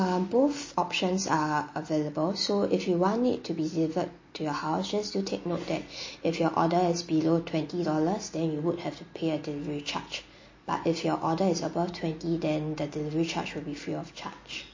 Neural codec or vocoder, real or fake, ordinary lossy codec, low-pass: none; real; MP3, 32 kbps; 7.2 kHz